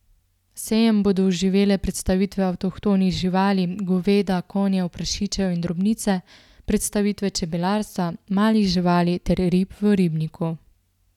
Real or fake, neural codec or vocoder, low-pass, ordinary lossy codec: real; none; 19.8 kHz; none